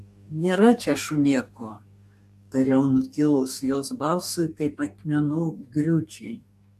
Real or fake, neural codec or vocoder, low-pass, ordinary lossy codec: fake; codec, 44.1 kHz, 2.6 kbps, DAC; 14.4 kHz; AAC, 96 kbps